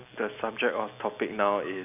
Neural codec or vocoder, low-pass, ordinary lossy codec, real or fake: none; 3.6 kHz; none; real